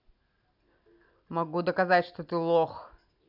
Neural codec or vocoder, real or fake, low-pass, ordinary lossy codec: none; real; 5.4 kHz; none